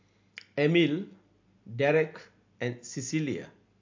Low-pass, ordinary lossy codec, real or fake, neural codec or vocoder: 7.2 kHz; MP3, 48 kbps; real; none